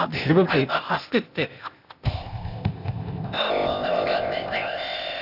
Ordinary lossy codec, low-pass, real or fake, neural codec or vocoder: none; 5.4 kHz; fake; codec, 16 kHz, 0.8 kbps, ZipCodec